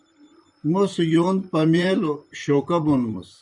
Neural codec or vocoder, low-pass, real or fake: vocoder, 44.1 kHz, 128 mel bands, Pupu-Vocoder; 10.8 kHz; fake